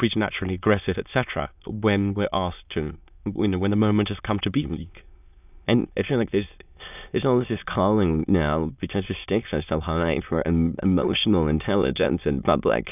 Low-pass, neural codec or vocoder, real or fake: 3.6 kHz; autoencoder, 22.05 kHz, a latent of 192 numbers a frame, VITS, trained on many speakers; fake